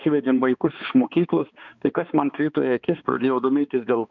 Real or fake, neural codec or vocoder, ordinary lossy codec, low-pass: fake; codec, 16 kHz, 2 kbps, X-Codec, HuBERT features, trained on balanced general audio; MP3, 64 kbps; 7.2 kHz